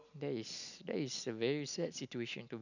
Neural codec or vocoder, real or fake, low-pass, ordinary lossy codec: none; real; 7.2 kHz; none